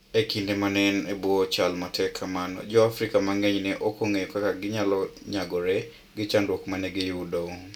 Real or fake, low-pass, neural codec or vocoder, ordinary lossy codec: real; 19.8 kHz; none; none